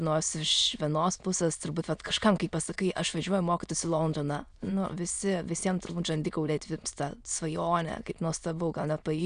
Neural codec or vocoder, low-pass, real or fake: autoencoder, 22.05 kHz, a latent of 192 numbers a frame, VITS, trained on many speakers; 9.9 kHz; fake